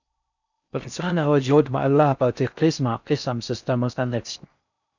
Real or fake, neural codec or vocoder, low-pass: fake; codec, 16 kHz in and 24 kHz out, 0.6 kbps, FocalCodec, streaming, 4096 codes; 7.2 kHz